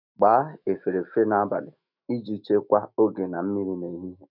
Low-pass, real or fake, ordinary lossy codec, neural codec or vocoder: 5.4 kHz; fake; none; autoencoder, 48 kHz, 128 numbers a frame, DAC-VAE, trained on Japanese speech